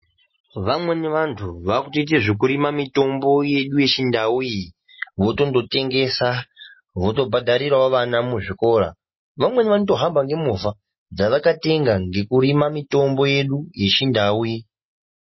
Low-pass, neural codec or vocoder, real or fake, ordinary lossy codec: 7.2 kHz; none; real; MP3, 24 kbps